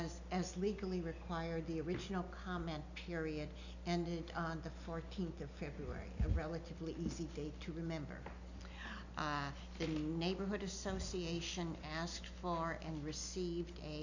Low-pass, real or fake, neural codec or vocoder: 7.2 kHz; real; none